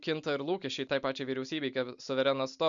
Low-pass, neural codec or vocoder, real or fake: 7.2 kHz; none; real